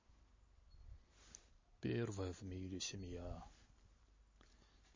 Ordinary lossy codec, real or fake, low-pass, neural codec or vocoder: MP3, 32 kbps; real; 7.2 kHz; none